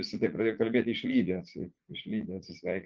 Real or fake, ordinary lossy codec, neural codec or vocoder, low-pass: fake; Opus, 24 kbps; vocoder, 44.1 kHz, 80 mel bands, Vocos; 7.2 kHz